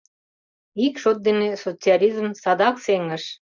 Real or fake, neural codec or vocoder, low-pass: real; none; 7.2 kHz